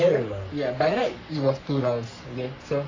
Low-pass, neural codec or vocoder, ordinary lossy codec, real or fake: 7.2 kHz; codec, 44.1 kHz, 3.4 kbps, Pupu-Codec; AAC, 32 kbps; fake